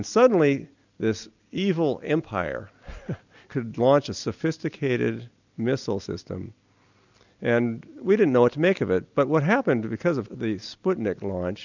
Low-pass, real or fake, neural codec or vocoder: 7.2 kHz; real; none